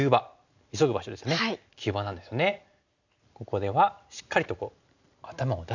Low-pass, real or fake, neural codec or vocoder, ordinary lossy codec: 7.2 kHz; real; none; none